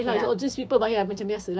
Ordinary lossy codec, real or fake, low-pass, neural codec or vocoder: none; fake; none; codec, 16 kHz, 6 kbps, DAC